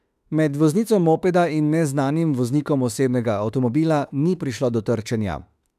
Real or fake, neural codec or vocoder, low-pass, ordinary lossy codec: fake; autoencoder, 48 kHz, 32 numbers a frame, DAC-VAE, trained on Japanese speech; 14.4 kHz; none